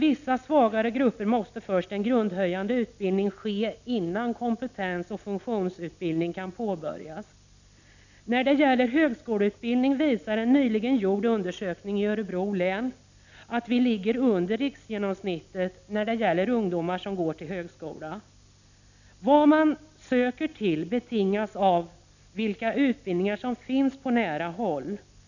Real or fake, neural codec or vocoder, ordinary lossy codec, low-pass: real; none; none; 7.2 kHz